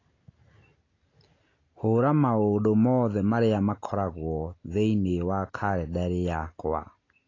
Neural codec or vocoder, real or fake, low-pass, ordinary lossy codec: none; real; 7.2 kHz; AAC, 32 kbps